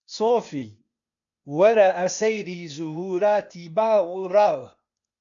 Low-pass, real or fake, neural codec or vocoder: 7.2 kHz; fake; codec, 16 kHz, 0.8 kbps, ZipCodec